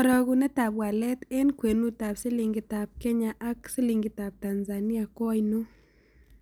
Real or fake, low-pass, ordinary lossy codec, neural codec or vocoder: real; none; none; none